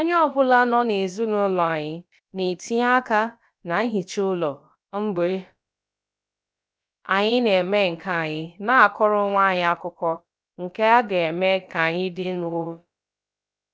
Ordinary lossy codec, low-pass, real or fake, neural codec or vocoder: none; none; fake; codec, 16 kHz, about 1 kbps, DyCAST, with the encoder's durations